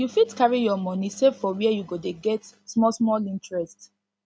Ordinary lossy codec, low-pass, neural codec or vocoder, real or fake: none; none; none; real